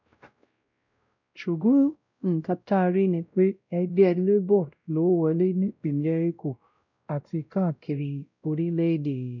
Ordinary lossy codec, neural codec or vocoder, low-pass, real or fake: none; codec, 16 kHz, 0.5 kbps, X-Codec, WavLM features, trained on Multilingual LibriSpeech; 7.2 kHz; fake